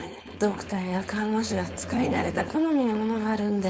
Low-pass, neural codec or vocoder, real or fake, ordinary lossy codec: none; codec, 16 kHz, 4.8 kbps, FACodec; fake; none